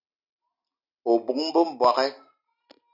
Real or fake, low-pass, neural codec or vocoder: real; 5.4 kHz; none